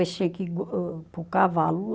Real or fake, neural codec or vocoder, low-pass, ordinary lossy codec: real; none; none; none